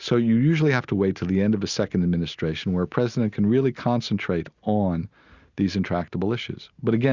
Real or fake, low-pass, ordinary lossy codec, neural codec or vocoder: real; 7.2 kHz; Opus, 64 kbps; none